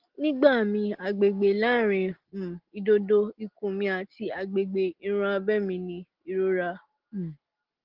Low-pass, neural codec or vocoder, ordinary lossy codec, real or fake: 5.4 kHz; none; Opus, 16 kbps; real